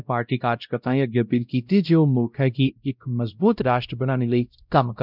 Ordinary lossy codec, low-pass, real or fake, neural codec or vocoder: none; 5.4 kHz; fake; codec, 16 kHz, 0.5 kbps, X-Codec, WavLM features, trained on Multilingual LibriSpeech